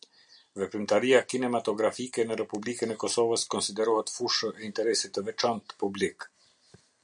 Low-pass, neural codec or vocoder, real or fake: 9.9 kHz; none; real